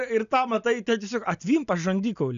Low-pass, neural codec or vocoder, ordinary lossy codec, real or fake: 7.2 kHz; none; AAC, 96 kbps; real